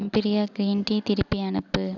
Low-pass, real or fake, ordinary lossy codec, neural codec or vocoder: 7.2 kHz; real; none; none